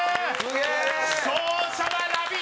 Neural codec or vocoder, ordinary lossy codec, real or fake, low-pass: none; none; real; none